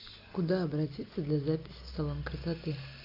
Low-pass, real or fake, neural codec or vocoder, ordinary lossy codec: 5.4 kHz; real; none; AAC, 32 kbps